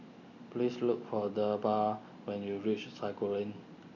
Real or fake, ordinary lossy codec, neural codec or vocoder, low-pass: real; none; none; 7.2 kHz